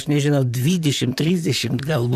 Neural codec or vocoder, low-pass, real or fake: vocoder, 44.1 kHz, 128 mel bands, Pupu-Vocoder; 14.4 kHz; fake